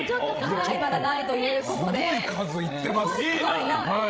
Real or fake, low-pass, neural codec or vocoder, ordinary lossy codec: fake; none; codec, 16 kHz, 16 kbps, FreqCodec, larger model; none